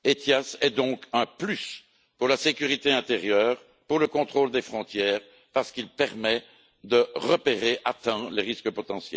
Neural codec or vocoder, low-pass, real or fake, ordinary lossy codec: none; none; real; none